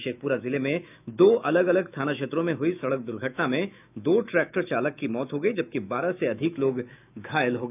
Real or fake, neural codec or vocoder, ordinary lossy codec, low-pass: fake; autoencoder, 48 kHz, 128 numbers a frame, DAC-VAE, trained on Japanese speech; none; 3.6 kHz